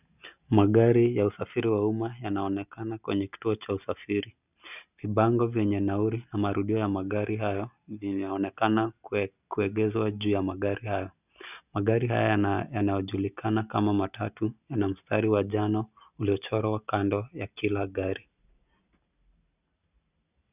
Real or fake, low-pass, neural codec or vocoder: real; 3.6 kHz; none